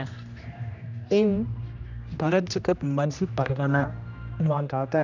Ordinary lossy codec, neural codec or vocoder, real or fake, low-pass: none; codec, 16 kHz, 1 kbps, X-Codec, HuBERT features, trained on general audio; fake; 7.2 kHz